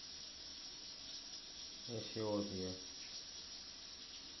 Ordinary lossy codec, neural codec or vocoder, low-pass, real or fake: MP3, 24 kbps; none; 7.2 kHz; real